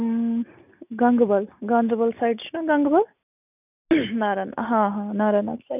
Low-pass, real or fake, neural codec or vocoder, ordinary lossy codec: 3.6 kHz; real; none; none